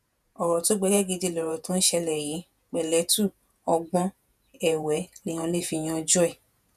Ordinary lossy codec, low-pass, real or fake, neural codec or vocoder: none; 14.4 kHz; fake; vocoder, 48 kHz, 128 mel bands, Vocos